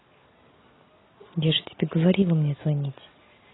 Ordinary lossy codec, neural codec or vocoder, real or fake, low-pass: AAC, 16 kbps; none; real; 7.2 kHz